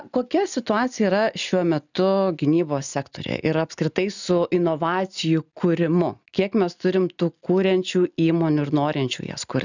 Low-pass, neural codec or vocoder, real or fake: 7.2 kHz; none; real